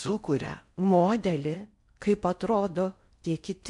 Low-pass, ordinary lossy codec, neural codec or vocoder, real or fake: 10.8 kHz; MP3, 64 kbps; codec, 16 kHz in and 24 kHz out, 0.6 kbps, FocalCodec, streaming, 4096 codes; fake